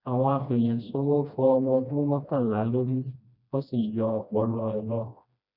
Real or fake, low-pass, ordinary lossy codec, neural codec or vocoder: fake; 5.4 kHz; none; codec, 16 kHz, 1 kbps, FreqCodec, smaller model